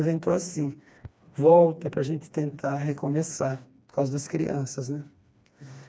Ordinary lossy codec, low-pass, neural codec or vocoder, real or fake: none; none; codec, 16 kHz, 2 kbps, FreqCodec, smaller model; fake